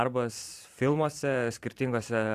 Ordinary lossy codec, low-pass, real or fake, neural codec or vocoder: AAC, 64 kbps; 14.4 kHz; fake; vocoder, 44.1 kHz, 128 mel bands every 512 samples, BigVGAN v2